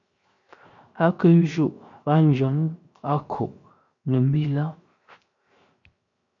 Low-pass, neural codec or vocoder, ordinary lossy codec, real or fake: 7.2 kHz; codec, 16 kHz, 0.7 kbps, FocalCodec; MP3, 48 kbps; fake